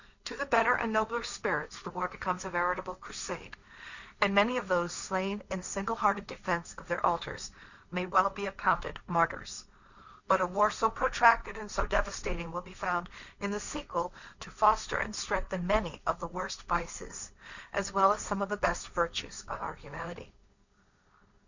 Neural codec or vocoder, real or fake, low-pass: codec, 16 kHz, 1.1 kbps, Voila-Tokenizer; fake; 7.2 kHz